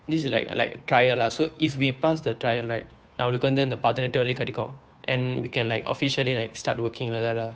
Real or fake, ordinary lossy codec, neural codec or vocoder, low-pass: fake; none; codec, 16 kHz, 2 kbps, FunCodec, trained on Chinese and English, 25 frames a second; none